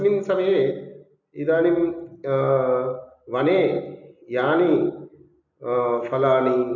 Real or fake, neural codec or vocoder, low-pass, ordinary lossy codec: real; none; 7.2 kHz; none